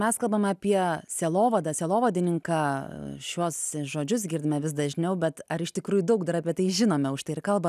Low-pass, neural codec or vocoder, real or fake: 14.4 kHz; none; real